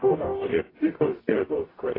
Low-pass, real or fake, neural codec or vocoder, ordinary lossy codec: 5.4 kHz; fake; codec, 44.1 kHz, 0.9 kbps, DAC; AAC, 24 kbps